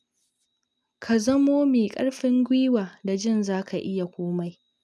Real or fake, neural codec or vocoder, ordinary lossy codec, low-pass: real; none; none; none